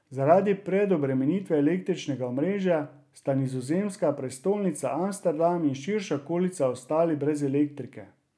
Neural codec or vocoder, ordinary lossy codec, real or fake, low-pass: none; none; real; none